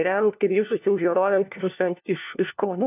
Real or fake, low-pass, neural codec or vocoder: fake; 3.6 kHz; codec, 16 kHz, 1 kbps, FunCodec, trained on LibriTTS, 50 frames a second